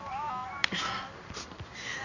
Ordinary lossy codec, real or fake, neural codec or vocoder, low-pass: none; fake; codec, 16 kHz in and 24 kHz out, 1 kbps, XY-Tokenizer; 7.2 kHz